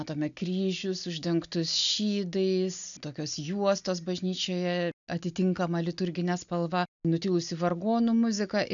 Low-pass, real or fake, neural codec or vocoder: 7.2 kHz; real; none